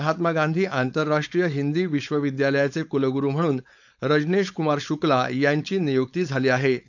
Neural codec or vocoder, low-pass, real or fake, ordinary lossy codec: codec, 16 kHz, 4.8 kbps, FACodec; 7.2 kHz; fake; none